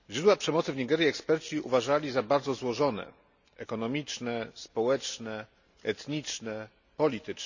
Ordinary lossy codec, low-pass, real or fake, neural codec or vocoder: none; 7.2 kHz; real; none